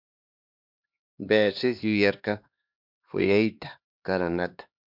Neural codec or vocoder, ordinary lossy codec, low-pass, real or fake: codec, 16 kHz, 2 kbps, X-Codec, HuBERT features, trained on LibriSpeech; MP3, 48 kbps; 5.4 kHz; fake